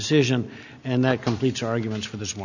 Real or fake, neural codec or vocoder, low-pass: real; none; 7.2 kHz